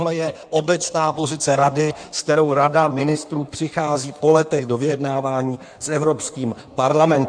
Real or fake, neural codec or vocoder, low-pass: fake; codec, 16 kHz in and 24 kHz out, 1.1 kbps, FireRedTTS-2 codec; 9.9 kHz